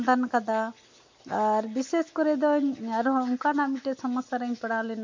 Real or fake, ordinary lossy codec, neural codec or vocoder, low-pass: real; MP3, 48 kbps; none; 7.2 kHz